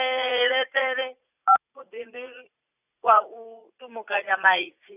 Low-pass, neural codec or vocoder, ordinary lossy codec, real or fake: 3.6 kHz; vocoder, 44.1 kHz, 80 mel bands, Vocos; none; fake